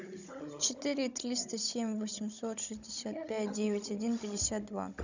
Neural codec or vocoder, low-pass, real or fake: codec, 16 kHz, 16 kbps, FunCodec, trained on Chinese and English, 50 frames a second; 7.2 kHz; fake